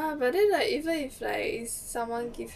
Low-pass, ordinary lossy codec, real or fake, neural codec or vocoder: 14.4 kHz; none; real; none